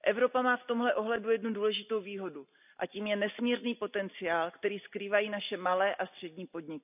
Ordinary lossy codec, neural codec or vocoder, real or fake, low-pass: none; none; real; 3.6 kHz